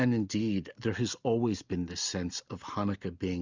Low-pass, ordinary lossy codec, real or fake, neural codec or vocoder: 7.2 kHz; Opus, 64 kbps; real; none